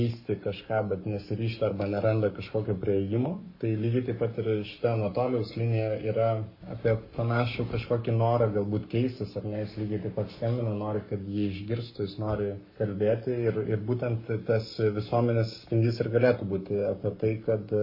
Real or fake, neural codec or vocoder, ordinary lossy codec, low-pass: fake; codec, 44.1 kHz, 7.8 kbps, Pupu-Codec; MP3, 24 kbps; 5.4 kHz